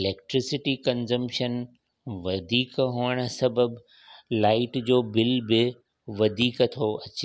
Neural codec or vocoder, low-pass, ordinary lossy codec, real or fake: none; none; none; real